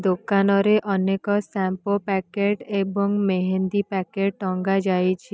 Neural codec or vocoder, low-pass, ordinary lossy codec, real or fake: none; none; none; real